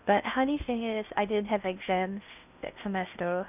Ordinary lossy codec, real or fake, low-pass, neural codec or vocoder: none; fake; 3.6 kHz; codec, 16 kHz in and 24 kHz out, 0.6 kbps, FocalCodec, streaming, 2048 codes